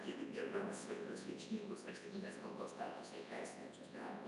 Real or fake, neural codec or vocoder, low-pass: fake; codec, 24 kHz, 0.9 kbps, WavTokenizer, large speech release; 10.8 kHz